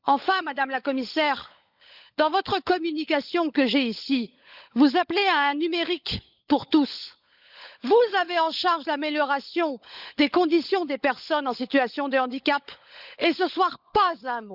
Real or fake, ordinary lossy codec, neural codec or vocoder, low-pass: fake; Opus, 64 kbps; codec, 16 kHz, 16 kbps, FunCodec, trained on LibriTTS, 50 frames a second; 5.4 kHz